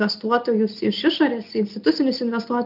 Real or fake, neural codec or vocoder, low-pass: real; none; 5.4 kHz